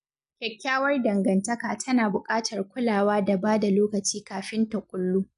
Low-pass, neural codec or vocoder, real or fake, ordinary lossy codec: 10.8 kHz; none; real; none